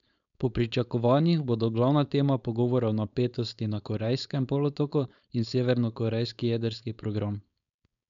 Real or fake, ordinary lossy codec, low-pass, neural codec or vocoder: fake; MP3, 96 kbps; 7.2 kHz; codec, 16 kHz, 4.8 kbps, FACodec